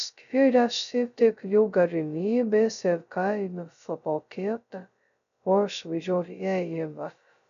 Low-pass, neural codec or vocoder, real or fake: 7.2 kHz; codec, 16 kHz, 0.2 kbps, FocalCodec; fake